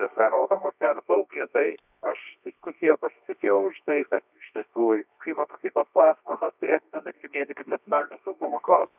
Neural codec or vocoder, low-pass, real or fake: codec, 24 kHz, 0.9 kbps, WavTokenizer, medium music audio release; 3.6 kHz; fake